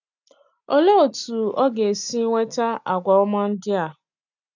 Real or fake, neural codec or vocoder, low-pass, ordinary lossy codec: real; none; 7.2 kHz; none